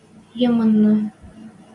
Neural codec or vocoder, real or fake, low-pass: none; real; 10.8 kHz